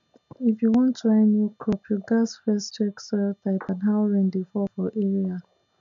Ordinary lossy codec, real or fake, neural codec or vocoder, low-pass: none; real; none; 7.2 kHz